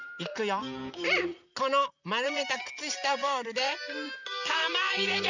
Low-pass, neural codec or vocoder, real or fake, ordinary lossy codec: 7.2 kHz; vocoder, 44.1 kHz, 128 mel bands, Pupu-Vocoder; fake; none